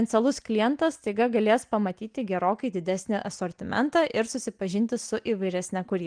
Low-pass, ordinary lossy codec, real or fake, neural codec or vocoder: 9.9 kHz; Opus, 24 kbps; real; none